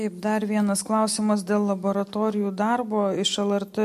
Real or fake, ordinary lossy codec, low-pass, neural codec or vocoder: real; MP3, 64 kbps; 14.4 kHz; none